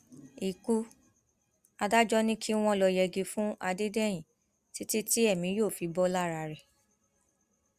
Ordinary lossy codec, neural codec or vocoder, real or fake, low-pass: Opus, 64 kbps; none; real; 14.4 kHz